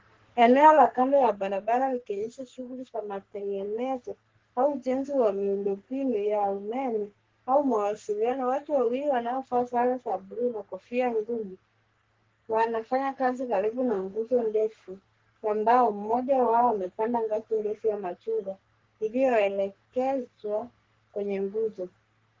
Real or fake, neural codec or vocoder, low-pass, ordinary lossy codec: fake; codec, 44.1 kHz, 3.4 kbps, Pupu-Codec; 7.2 kHz; Opus, 16 kbps